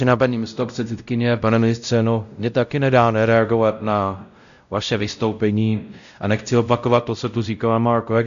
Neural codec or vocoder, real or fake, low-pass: codec, 16 kHz, 0.5 kbps, X-Codec, WavLM features, trained on Multilingual LibriSpeech; fake; 7.2 kHz